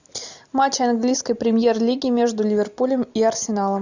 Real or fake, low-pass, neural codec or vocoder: real; 7.2 kHz; none